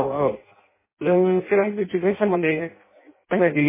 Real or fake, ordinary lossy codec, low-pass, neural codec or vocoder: fake; MP3, 16 kbps; 3.6 kHz; codec, 16 kHz in and 24 kHz out, 0.6 kbps, FireRedTTS-2 codec